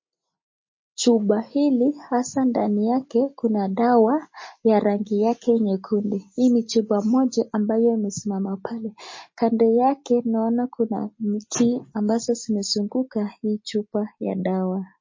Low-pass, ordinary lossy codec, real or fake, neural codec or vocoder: 7.2 kHz; MP3, 32 kbps; real; none